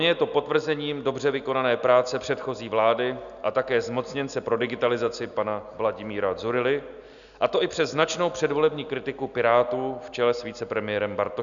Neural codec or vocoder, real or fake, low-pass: none; real; 7.2 kHz